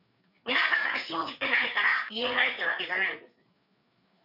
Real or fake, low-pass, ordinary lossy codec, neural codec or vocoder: fake; 5.4 kHz; none; codec, 16 kHz, 4 kbps, FreqCodec, larger model